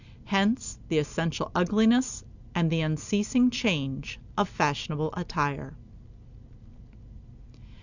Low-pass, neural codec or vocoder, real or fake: 7.2 kHz; none; real